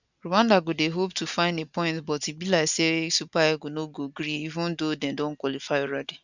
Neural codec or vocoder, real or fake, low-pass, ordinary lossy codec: none; real; 7.2 kHz; none